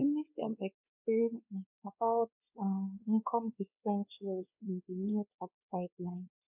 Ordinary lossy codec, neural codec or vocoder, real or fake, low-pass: none; codec, 16 kHz, 2 kbps, X-Codec, WavLM features, trained on Multilingual LibriSpeech; fake; 3.6 kHz